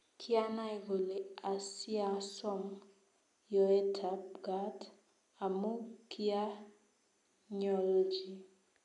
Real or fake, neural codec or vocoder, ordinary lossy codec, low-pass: real; none; none; 10.8 kHz